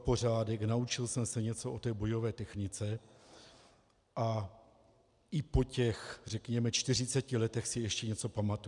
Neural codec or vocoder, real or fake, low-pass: none; real; 10.8 kHz